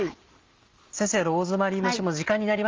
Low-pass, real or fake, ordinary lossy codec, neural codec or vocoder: 7.2 kHz; real; Opus, 32 kbps; none